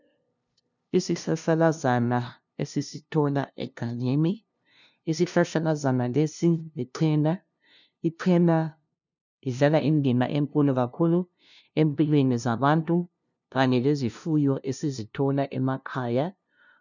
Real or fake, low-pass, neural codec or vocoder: fake; 7.2 kHz; codec, 16 kHz, 0.5 kbps, FunCodec, trained on LibriTTS, 25 frames a second